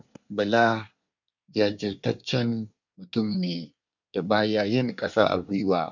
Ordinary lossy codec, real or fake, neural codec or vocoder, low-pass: none; fake; codec, 24 kHz, 1 kbps, SNAC; 7.2 kHz